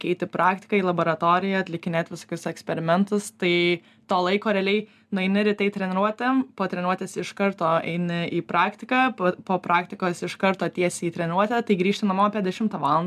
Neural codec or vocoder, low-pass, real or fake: none; 14.4 kHz; real